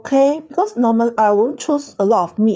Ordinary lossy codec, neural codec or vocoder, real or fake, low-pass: none; codec, 16 kHz, 4 kbps, FreqCodec, larger model; fake; none